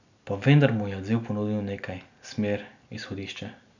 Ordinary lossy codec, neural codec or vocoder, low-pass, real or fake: none; none; 7.2 kHz; real